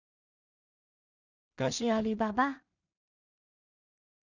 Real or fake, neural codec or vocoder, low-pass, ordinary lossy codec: fake; codec, 16 kHz in and 24 kHz out, 0.4 kbps, LongCat-Audio-Codec, two codebook decoder; 7.2 kHz; none